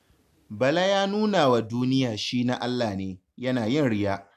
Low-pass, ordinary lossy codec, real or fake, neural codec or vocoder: 14.4 kHz; none; real; none